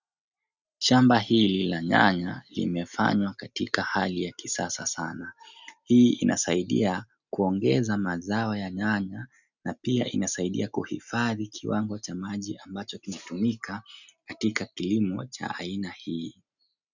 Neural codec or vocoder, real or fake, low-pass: none; real; 7.2 kHz